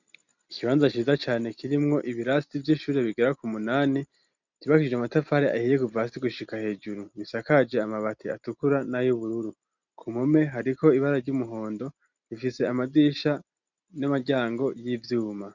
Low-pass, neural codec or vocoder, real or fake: 7.2 kHz; none; real